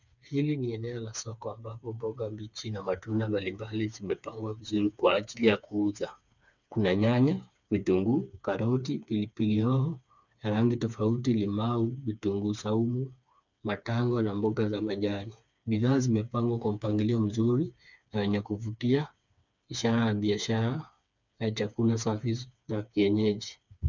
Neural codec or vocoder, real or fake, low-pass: codec, 16 kHz, 4 kbps, FreqCodec, smaller model; fake; 7.2 kHz